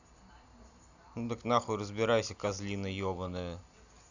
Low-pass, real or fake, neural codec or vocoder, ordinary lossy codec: 7.2 kHz; real; none; none